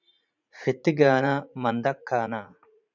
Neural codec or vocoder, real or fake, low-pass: vocoder, 44.1 kHz, 80 mel bands, Vocos; fake; 7.2 kHz